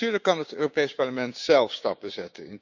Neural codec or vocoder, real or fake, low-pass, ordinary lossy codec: codec, 16 kHz, 6 kbps, DAC; fake; 7.2 kHz; none